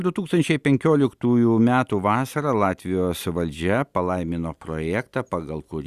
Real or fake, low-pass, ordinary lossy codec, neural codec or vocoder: real; 14.4 kHz; Opus, 64 kbps; none